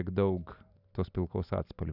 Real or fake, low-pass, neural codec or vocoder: real; 5.4 kHz; none